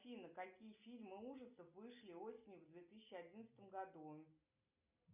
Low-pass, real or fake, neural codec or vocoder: 3.6 kHz; real; none